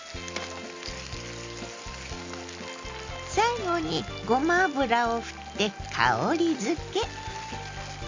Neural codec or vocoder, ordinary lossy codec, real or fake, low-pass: none; MP3, 48 kbps; real; 7.2 kHz